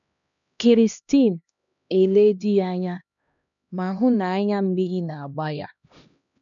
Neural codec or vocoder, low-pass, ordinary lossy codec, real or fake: codec, 16 kHz, 1 kbps, X-Codec, HuBERT features, trained on LibriSpeech; 7.2 kHz; none; fake